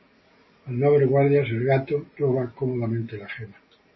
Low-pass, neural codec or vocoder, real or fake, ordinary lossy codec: 7.2 kHz; none; real; MP3, 24 kbps